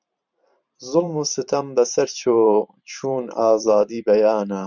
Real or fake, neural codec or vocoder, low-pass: fake; vocoder, 44.1 kHz, 128 mel bands every 512 samples, BigVGAN v2; 7.2 kHz